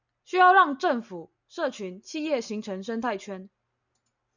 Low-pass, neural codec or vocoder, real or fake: 7.2 kHz; none; real